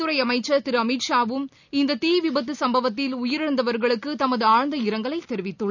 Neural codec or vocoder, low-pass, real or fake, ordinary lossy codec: none; 7.2 kHz; real; none